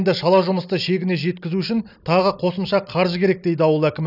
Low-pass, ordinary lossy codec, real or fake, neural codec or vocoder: 5.4 kHz; none; real; none